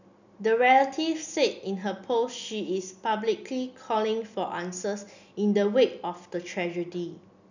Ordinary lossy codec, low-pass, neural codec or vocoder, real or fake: none; 7.2 kHz; none; real